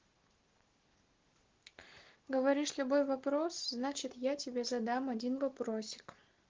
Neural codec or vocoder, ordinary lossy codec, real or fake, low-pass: none; Opus, 16 kbps; real; 7.2 kHz